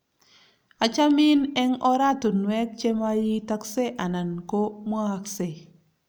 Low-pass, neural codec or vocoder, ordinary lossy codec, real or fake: none; none; none; real